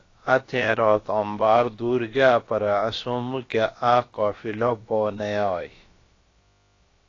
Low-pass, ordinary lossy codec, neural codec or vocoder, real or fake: 7.2 kHz; AAC, 32 kbps; codec, 16 kHz, about 1 kbps, DyCAST, with the encoder's durations; fake